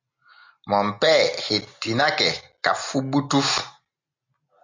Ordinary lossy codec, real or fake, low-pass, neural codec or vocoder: MP3, 48 kbps; real; 7.2 kHz; none